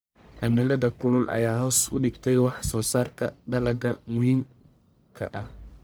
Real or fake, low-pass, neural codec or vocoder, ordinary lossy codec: fake; none; codec, 44.1 kHz, 1.7 kbps, Pupu-Codec; none